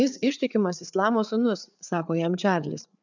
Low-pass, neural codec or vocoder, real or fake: 7.2 kHz; codec, 16 kHz, 4 kbps, FreqCodec, larger model; fake